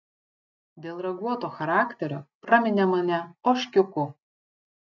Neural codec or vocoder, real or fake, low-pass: none; real; 7.2 kHz